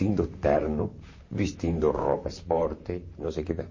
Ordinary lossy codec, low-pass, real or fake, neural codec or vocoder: MP3, 32 kbps; 7.2 kHz; fake; vocoder, 44.1 kHz, 128 mel bands, Pupu-Vocoder